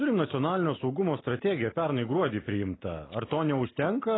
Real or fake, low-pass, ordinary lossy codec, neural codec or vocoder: real; 7.2 kHz; AAC, 16 kbps; none